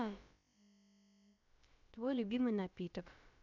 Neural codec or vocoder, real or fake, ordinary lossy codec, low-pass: codec, 16 kHz, about 1 kbps, DyCAST, with the encoder's durations; fake; none; 7.2 kHz